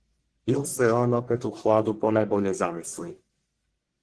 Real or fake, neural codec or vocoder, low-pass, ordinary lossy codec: fake; codec, 44.1 kHz, 1.7 kbps, Pupu-Codec; 10.8 kHz; Opus, 16 kbps